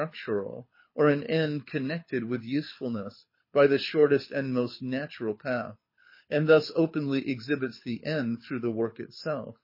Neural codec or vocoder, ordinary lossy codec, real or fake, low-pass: codec, 24 kHz, 6 kbps, HILCodec; MP3, 24 kbps; fake; 5.4 kHz